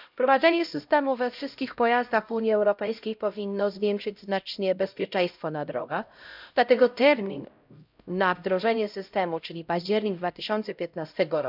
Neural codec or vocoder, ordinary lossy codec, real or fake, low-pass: codec, 16 kHz, 0.5 kbps, X-Codec, HuBERT features, trained on LibriSpeech; none; fake; 5.4 kHz